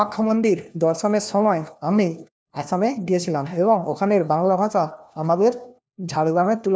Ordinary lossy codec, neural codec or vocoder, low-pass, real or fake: none; codec, 16 kHz, 1 kbps, FunCodec, trained on LibriTTS, 50 frames a second; none; fake